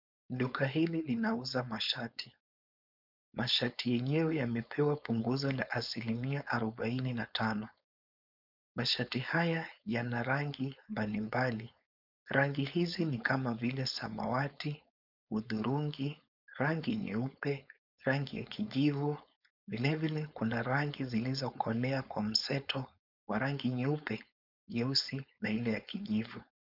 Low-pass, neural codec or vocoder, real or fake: 5.4 kHz; codec, 16 kHz, 4.8 kbps, FACodec; fake